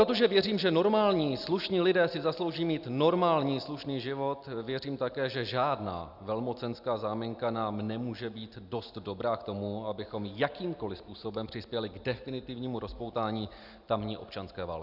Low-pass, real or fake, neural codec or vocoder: 5.4 kHz; real; none